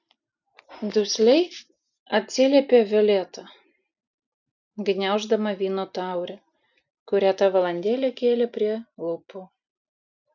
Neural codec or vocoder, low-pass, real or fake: none; 7.2 kHz; real